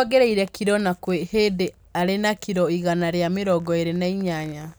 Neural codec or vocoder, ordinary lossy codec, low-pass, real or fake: none; none; none; real